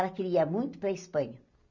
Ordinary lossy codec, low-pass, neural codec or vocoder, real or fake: none; 7.2 kHz; none; real